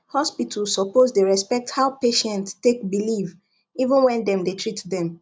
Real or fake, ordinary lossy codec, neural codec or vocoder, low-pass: real; none; none; none